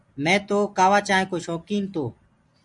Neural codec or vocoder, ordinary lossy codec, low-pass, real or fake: none; MP3, 64 kbps; 10.8 kHz; real